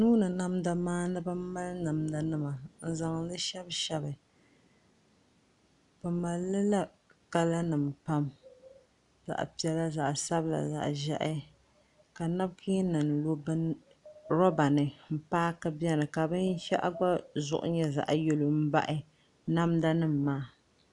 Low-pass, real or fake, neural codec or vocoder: 10.8 kHz; real; none